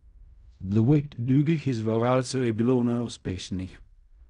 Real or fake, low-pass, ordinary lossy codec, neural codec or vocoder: fake; 10.8 kHz; none; codec, 16 kHz in and 24 kHz out, 0.4 kbps, LongCat-Audio-Codec, fine tuned four codebook decoder